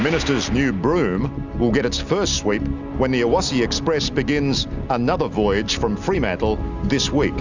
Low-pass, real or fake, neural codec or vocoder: 7.2 kHz; real; none